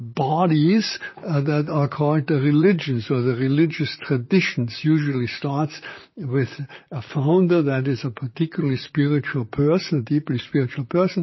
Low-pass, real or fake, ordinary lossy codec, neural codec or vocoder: 7.2 kHz; fake; MP3, 24 kbps; vocoder, 44.1 kHz, 128 mel bands, Pupu-Vocoder